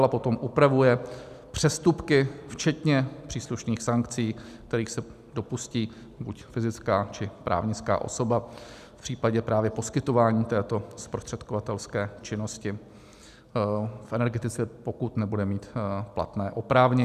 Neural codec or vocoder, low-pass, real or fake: none; 14.4 kHz; real